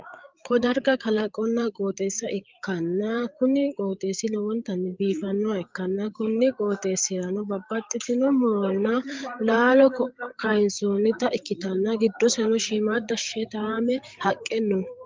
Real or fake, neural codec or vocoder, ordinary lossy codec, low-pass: fake; codec, 16 kHz, 8 kbps, FreqCodec, larger model; Opus, 32 kbps; 7.2 kHz